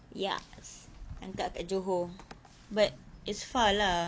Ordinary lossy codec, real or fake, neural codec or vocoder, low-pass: none; real; none; none